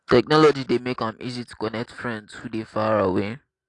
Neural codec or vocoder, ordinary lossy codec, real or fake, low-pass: none; AAC, 48 kbps; real; 10.8 kHz